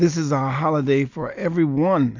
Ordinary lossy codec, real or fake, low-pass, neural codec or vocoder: AAC, 48 kbps; real; 7.2 kHz; none